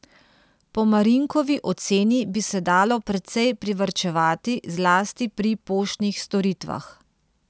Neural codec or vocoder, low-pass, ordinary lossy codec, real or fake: none; none; none; real